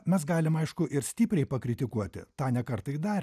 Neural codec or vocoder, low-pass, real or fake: none; 14.4 kHz; real